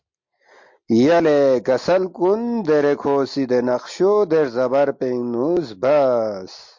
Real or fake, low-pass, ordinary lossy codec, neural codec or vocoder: real; 7.2 kHz; MP3, 48 kbps; none